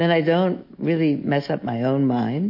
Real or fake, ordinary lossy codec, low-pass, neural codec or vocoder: real; MP3, 32 kbps; 5.4 kHz; none